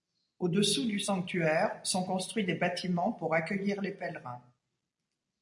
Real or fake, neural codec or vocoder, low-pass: real; none; 10.8 kHz